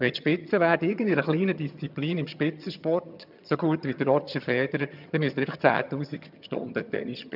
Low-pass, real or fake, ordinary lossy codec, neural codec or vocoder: 5.4 kHz; fake; none; vocoder, 22.05 kHz, 80 mel bands, HiFi-GAN